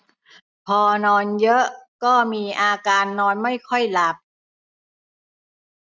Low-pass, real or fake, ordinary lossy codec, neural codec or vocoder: none; real; none; none